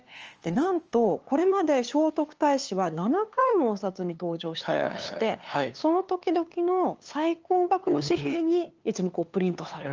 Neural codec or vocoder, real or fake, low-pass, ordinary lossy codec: codec, 16 kHz, 2 kbps, FunCodec, trained on LibriTTS, 25 frames a second; fake; 7.2 kHz; Opus, 24 kbps